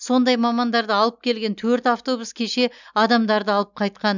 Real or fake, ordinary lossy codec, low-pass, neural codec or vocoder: real; none; 7.2 kHz; none